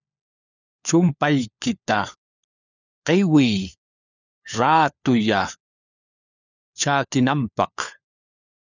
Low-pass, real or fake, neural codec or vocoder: 7.2 kHz; fake; codec, 16 kHz, 4 kbps, FunCodec, trained on LibriTTS, 50 frames a second